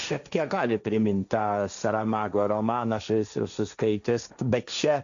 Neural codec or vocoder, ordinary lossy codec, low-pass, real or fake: codec, 16 kHz, 1.1 kbps, Voila-Tokenizer; MP3, 96 kbps; 7.2 kHz; fake